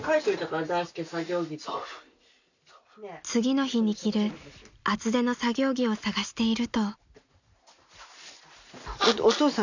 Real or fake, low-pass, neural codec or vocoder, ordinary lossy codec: real; 7.2 kHz; none; none